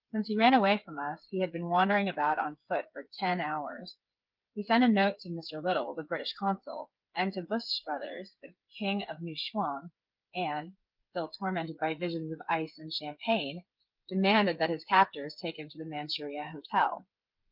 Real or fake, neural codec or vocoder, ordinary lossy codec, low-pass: fake; codec, 16 kHz, 8 kbps, FreqCodec, smaller model; Opus, 24 kbps; 5.4 kHz